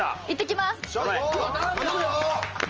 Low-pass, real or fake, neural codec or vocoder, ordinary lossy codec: 7.2 kHz; real; none; Opus, 24 kbps